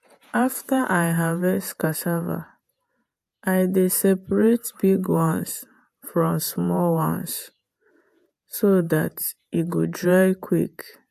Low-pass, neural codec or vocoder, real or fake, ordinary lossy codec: 14.4 kHz; vocoder, 48 kHz, 128 mel bands, Vocos; fake; AAC, 96 kbps